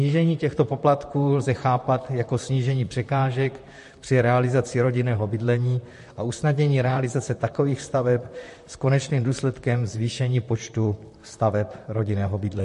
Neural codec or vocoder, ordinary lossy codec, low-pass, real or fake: vocoder, 44.1 kHz, 128 mel bands, Pupu-Vocoder; MP3, 48 kbps; 14.4 kHz; fake